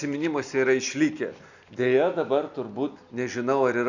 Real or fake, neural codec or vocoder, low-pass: real; none; 7.2 kHz